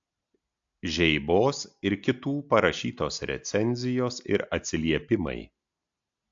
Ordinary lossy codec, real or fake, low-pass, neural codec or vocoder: AAC, 64 kbps; real; 7.2 kHz; none